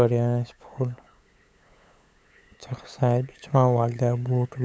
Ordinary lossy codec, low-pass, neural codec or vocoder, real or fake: none; none; codec, 16 kHz, 8 kbps, FunCodec, trained on LibriTTS, 25 frames a second; fake